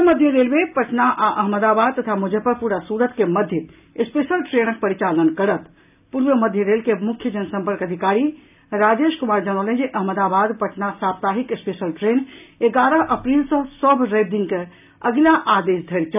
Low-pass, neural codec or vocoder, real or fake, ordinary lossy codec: 3.6 kHz; none; real; none